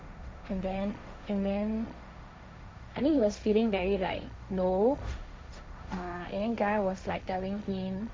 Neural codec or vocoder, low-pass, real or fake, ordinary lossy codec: codec, 16 kHz, 1.1 kbps, Voila-Tokenizer; none; fake; none